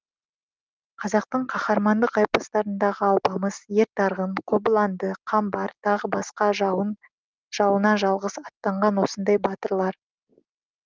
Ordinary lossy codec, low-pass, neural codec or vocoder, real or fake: Opus, 24 kbps; 7.2 kHz; none; real